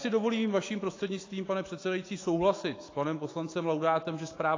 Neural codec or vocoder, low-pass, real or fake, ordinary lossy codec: autoencoder, 48 kHz, 128 numbers a frame, DAC-VAE, trained on Japanese speech; 7.2 kHz; fake; AAC, 32 kbps